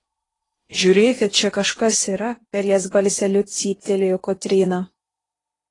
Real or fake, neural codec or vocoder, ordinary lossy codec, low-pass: fake; codec, 16 kHz in and 24 kHz out, 0.8 kbps, FocalCodec, streaming, 65536 codes; AAC, 32 kbps; 10.8 kHz